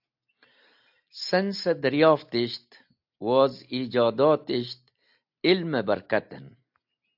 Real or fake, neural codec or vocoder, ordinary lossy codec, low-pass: real; none; AAC, 48 kbps; 5.4 kHz